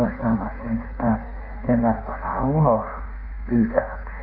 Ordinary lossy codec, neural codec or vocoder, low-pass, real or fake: none; codec, 16 kHz in and 24 kHz out, 1.1 kbps, FireRedTTS-2 codec; 5.4 kHz; fake